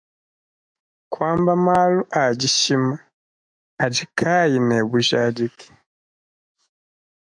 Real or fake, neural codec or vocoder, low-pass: fake; autoencoder, 48 kHz, 128 numbers a frame, DAC-VAE, trained on Japanese speech; 9.9 kHz